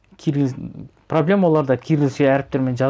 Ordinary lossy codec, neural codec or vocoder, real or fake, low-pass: none; none; real; none